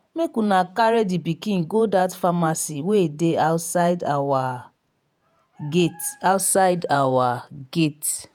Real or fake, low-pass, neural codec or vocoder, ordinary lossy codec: fake; none; vocoder, 48 kHz, 128 mel bands, Vocos; none